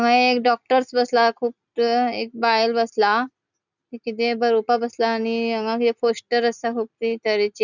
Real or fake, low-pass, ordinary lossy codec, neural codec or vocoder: real; 7.2 kHz; none; none